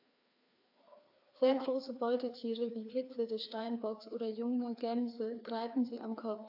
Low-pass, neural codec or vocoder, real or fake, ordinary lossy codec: 5.4 kHz; codec, 16 kHz, 2 kbps, FreqCodec, larger model; fake; AAC, 48 kbps